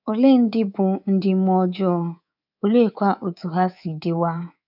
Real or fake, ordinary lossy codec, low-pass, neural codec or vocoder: fake; none; 5.4 kHz; codec, 24 kHz, 3.1 kbps, DualCodec